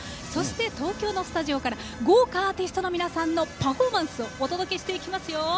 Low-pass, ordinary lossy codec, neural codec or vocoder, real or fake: none; none; none; real